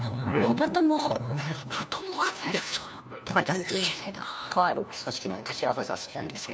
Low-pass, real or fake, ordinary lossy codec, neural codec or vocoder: none; fake; none; codec, 16 kHz, 1 kbps, FunCodec, trained on LibriTTS, 50 frames a second